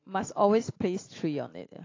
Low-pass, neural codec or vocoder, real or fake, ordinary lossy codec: 7.2 kHz; none; real; AAC, 32 kbps